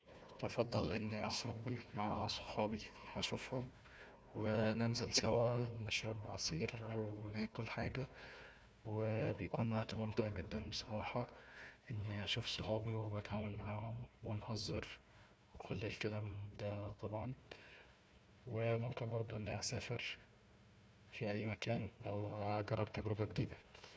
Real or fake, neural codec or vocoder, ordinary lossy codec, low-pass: fake; codec, 16 kHz, 1 kbps, FunCodec, trained on Chinese and English, 50 frames a second; none; none